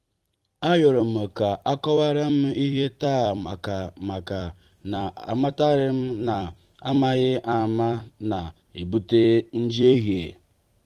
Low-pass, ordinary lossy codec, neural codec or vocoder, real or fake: 14.4 kHz; Opus, 24 kbps; vocoder, 44.1 kHz, 128 mel bands every 256 samples, BigVGAN v2; fake